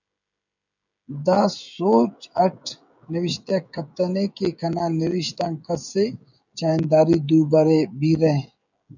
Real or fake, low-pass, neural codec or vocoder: fake; 7.2 kHz; codec, 16 kHz, 16 kbps, FreqCodec, smaller model